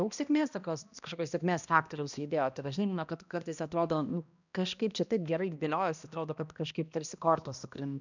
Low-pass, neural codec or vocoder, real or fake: 7.2 kHz; codec, 16 kHz, 1 kbps, X-Codec, HuBERT features, trained on balanced general audio; fake